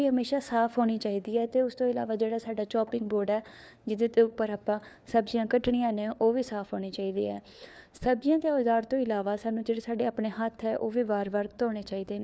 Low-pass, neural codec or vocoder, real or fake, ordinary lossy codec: none; codec, 16 kHz, 4 kbps, FunCodec, trained on LibriTTS, 50 frames a second; fake; none